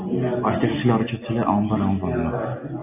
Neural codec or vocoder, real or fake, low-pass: none; real; 3.6 kHz